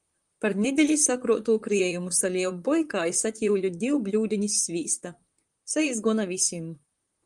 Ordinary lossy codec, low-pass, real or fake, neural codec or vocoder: Opus, 32 kbps; 10.8 kHz; fake; vocoder, 44.1 kHz, 128 mel bands, Pupu-Vocoder